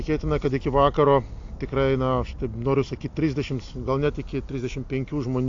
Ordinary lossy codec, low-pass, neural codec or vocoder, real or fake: AAC, 48 kbps; 7.2 kHz; none; real